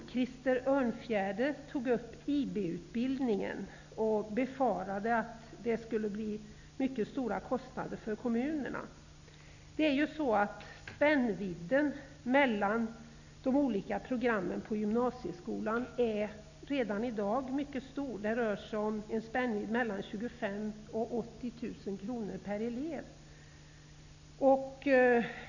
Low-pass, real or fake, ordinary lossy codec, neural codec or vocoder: 7.2 kHz; real; none; none